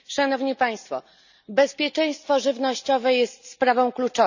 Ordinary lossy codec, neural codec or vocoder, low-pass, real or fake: none; none; 7.2 kHz; real